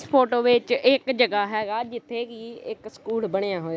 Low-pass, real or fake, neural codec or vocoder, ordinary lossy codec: none; real; none; none